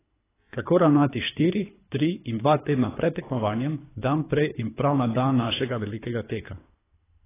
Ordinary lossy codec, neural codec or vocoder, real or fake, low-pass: AAC, 16 kbps; codec, 24 kHz, 3 kbps, HILCodec; fake; 3.6 kHz